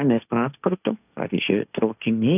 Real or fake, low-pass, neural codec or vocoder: fake; 3.6 kHz; codec, 16 kHz, 1.1 kbps, Voila-Tokenizer